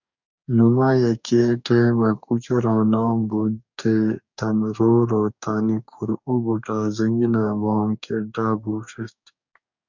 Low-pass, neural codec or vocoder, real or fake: 7.2 kHz; codec, 44.1 kHz, 2.6 kbps, DAC; fake